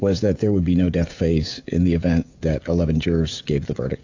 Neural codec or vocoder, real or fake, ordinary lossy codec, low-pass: codec, 16 kHz, 16 kbps, FreqCodec, smaller model; fake; AAC, 48 kbps; 7.2 kHz